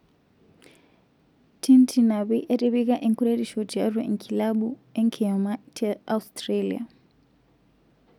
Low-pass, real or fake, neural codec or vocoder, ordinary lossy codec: 19.8 kHz; real; none; none